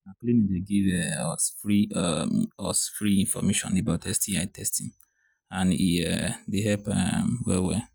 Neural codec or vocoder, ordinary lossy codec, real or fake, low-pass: none; none; real; none